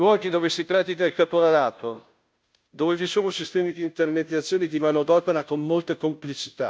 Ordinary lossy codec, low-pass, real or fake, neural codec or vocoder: none; none; fake; codec, 16 kHz, 0.5 kbps, FunCodec, trained on Chinese and English, 25 frames a second